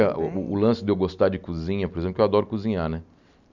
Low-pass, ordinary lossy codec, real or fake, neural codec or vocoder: 7.2 kHz; none; real; none